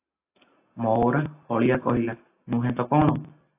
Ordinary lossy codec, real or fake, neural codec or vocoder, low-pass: AAC, 24 kbps; real; none; 3.6 kHz